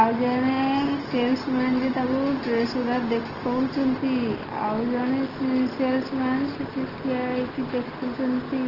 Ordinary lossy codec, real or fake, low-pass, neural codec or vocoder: Opus, 16 kbps; real; 5.4 kHz; none